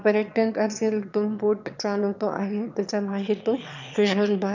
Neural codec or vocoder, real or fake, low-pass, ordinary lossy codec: autoencoder, 22.05 kHz, a latent of 192 numbers a frame, VITS, trained on one speaker; fake; 7.2 kHz; none